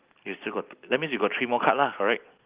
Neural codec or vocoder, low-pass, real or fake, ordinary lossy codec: none; 3.6 kHz; real; Opus, 32 kbps